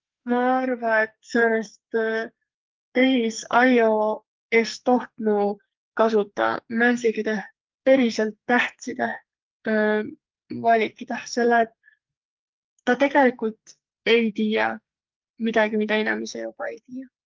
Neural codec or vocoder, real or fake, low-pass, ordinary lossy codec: codec, 44.1 kHz, 2.6 kbps, SNAC; fake; 7.2 kHz; Opus, 32 kbps